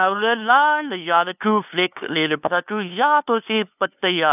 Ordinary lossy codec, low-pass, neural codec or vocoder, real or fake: none; 3.6 kHz; codec, 16 kHz, 2 kbps, FunCodec, trained on LibriTTS, 25 frames a second; fake